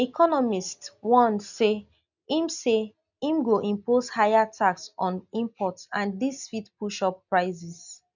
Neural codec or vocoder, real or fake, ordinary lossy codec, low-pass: none; real; none; 7.2 kHz